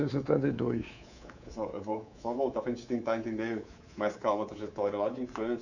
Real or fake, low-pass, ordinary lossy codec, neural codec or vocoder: fake; 7.2 kHz; none; codec, 24 kHz, 3.1 kbps, DualCodec